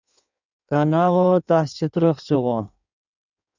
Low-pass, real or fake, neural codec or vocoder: 7.2 kHz; fake; codec, 16 kHz in and 24 kHz out, 1.1 kbps, FireRedTTS-2 codec